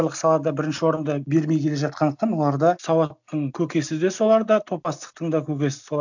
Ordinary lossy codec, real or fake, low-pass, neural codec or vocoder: none; real; 7.2 kHz; none